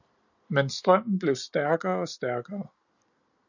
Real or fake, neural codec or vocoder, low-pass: real; none; 7.2 kHz